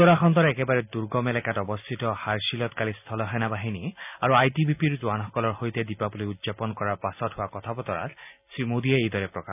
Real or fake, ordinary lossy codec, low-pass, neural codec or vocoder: real; none; 3.6 kHz; none